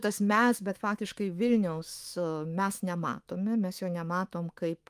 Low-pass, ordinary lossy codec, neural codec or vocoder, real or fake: 14.4 kHz; Opus, 32 kbps; autoencoder, 48 kHz, 128 numbers a frame, DAC-VAE, trained on Japanese speech; fake